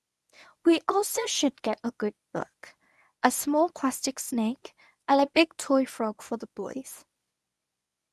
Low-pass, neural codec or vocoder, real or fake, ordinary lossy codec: none; codec, 24 kHz, 0.9 kbps, WavTokenizer, medium speech release version 1; fake; none